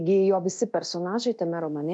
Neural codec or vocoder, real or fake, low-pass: codec, 24 kHz, 0.9 kbps, DualCodec; fake; 10.8 kHz